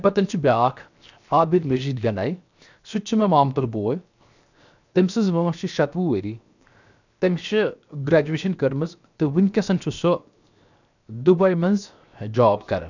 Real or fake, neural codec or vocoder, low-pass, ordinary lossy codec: fake; codec, 16 kHz, 0.7 kbps, FocalCodec; 7.2 kHz; none